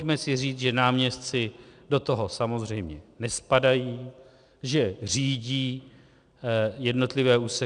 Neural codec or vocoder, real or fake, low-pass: none; real; 9.9 kHz